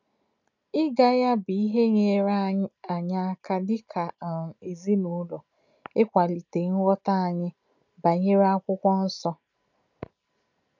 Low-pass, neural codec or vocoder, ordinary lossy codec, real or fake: 7.2 kHz; none; none; real